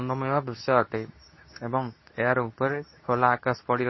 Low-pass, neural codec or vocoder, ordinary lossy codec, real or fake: 7.2 kHz; codec, 24 kHz, 0.9 kbps, WavTokenizer, medium speech release version 2; MP3, 24 kbps; fake